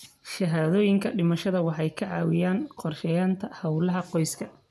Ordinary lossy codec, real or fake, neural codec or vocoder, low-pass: none; real; none; 14.4 kHz